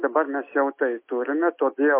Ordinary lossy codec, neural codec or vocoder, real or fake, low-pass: MP3, 24 kbps; none; real; 3.6 kHz